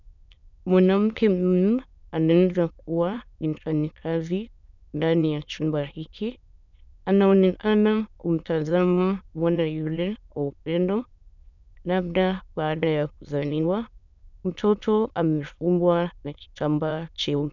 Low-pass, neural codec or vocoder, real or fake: 7.2 kHz; autoencoder, 22.05 kHz, a latent of 192 numbers a frame, VITS, trained on many speakers; fake